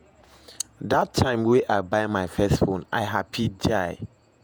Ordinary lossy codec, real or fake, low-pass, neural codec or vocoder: none; fake; none; vocoder, 48 kHz, 128 mel bands, Vocos